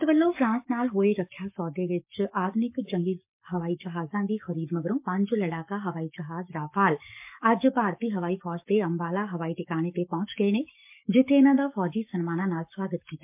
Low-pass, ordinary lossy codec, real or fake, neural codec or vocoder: 3.6 kHz; MP3, 32 kbps; fake; codec, 44.1 kHz, 7.8 kbps, Pupu-Codec